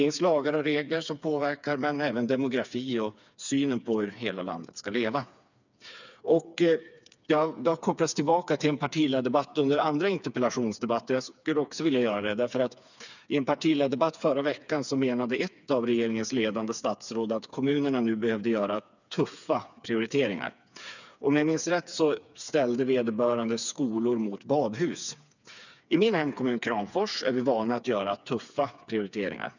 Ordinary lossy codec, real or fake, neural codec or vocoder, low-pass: none; fake; codec, 16 kHz, 4 kbps, FreqCodec, smaller model; 7.2 kHz